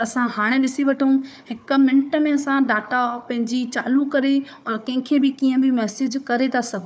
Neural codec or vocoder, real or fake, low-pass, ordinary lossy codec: codec, 16 kHz, 4 kbps, FunCodec, trained on Chinese and English, 50 frames a second; fake; none; none